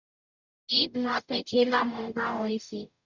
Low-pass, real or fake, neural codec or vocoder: 7.2 kHz; fake; codec, 44.1 kHz, 0.9 kbps, DAC